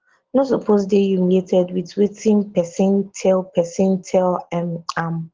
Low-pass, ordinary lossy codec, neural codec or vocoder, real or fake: 7.2 kHz; Opus, 16 kbps; none; real